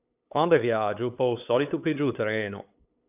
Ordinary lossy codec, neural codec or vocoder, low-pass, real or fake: AAC, 32 kbps; codec, 16 kHz, 8 kbps, FunCodec, trained on LibriTTS, 25 frames a second; 3.6 kHz; fake